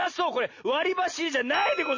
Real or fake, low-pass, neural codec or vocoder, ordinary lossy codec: real; 7.2 kHz; none; MP3, 32 kbps